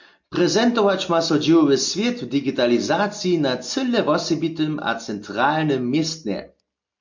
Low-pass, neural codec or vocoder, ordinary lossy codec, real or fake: 7.2 kHz; none; MP3, 48 kbps; real